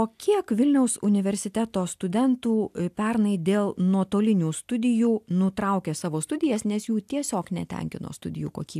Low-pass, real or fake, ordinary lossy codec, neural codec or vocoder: 14.4 kHz; real; AAC, 96 kbps; none